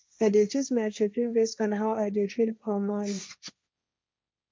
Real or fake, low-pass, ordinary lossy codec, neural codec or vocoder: fake; none; none; codec, 16 kHz, 1.1 kbps, Voila-Tokenizer